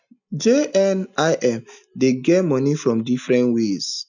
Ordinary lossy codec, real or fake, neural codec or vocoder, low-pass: none; real; none; 7.2 kHz